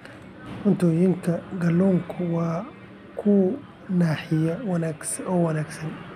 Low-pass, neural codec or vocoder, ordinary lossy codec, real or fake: 14.4 kHz; none; none; real